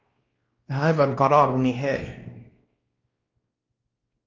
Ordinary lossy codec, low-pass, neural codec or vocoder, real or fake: Opus, 24 kbps; 7.2 kHz; codec, 16 kHz, 1 kbps, X-Codec, WavLM features, trained on Multilingual LibriSpeech; fake